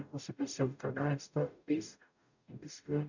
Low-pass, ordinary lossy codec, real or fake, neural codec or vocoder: 7.2 kHz; none; fake; codec, 44.1 kHz, 0.9 kbps, DAC